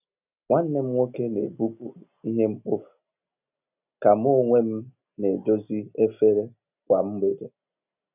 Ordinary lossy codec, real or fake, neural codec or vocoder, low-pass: none; real; none; 3.6 kHz